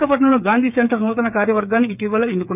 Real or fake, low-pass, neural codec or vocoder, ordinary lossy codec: fake; 3.6 kHz; codec, 16 kHz, 8 kbps, FreqCodec, smaller model; none